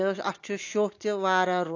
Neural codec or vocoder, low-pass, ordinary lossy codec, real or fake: none; 7.2 kHz; AAC, 48 kbps; real